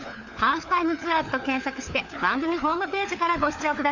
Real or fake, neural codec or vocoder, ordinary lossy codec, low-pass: fake; codec, 16 kHz, 4 kbps, FunCodec, trained on LibriTTS, 50 frames a second; none; 7.2 kHz